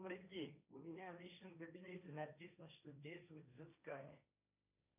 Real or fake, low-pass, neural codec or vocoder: fake; 3.6 kHz; codec, 16 kHz, 1.1 kbps, Voila-Tokenizer